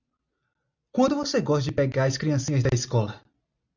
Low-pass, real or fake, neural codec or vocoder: 7.2 kHz; real; none